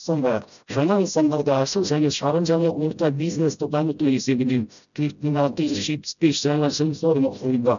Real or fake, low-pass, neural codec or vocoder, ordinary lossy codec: fake; 7.2 kHz; codec, 16 kHz, 0.5 kbps, FreqCodec, smaller model; none